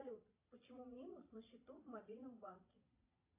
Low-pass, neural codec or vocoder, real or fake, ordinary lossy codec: 3.6 kHz; vocoder, 22.05 kHz, 80 mel bands, Vocos; fake; AAC, 32 kbps